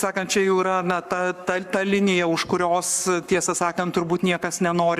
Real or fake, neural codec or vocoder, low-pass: fake; codec, 44.1 kHz, 7.8 kbps, Pupu-Codec; 14.4 kHz